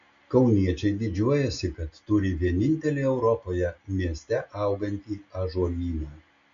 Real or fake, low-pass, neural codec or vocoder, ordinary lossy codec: real; 7.2 kHz; none; MP3, 48 kbps